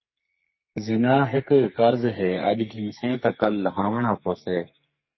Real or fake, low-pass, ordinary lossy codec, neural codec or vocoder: fake; 7.2 kHz; MP3, 24 kbps; codec, 44.1 kHz, 2.6 kbps, SNAC